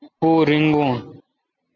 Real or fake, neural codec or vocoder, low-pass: real; none; 7.2 kHz